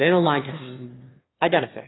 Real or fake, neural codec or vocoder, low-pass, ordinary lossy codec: fake; autoencoder, 22.05 kHz, a latent of 192 numbers a frame, VITS, trained on one speaker; 7.2 kHz; AAC, 16 kbps